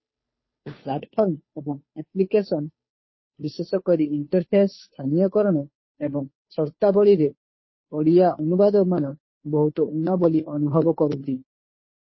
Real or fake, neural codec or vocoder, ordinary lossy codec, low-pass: fake; codec, 16 kHz, 2 kbps, FunCodec, trained on Chinese and English, 25 frames a second; MP3, 24 kbps; 7.2 kHz